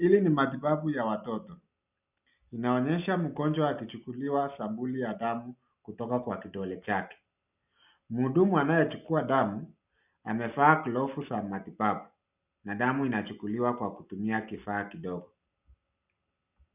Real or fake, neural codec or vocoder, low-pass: real; none; 3.6 kHz